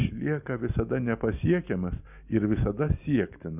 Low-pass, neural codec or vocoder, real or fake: 3.6 kHz; none; real